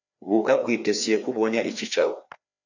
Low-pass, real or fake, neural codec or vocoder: 7.2 kHz; fake; codec, 16 kHz, 2 kbps, FreqCodec, larger model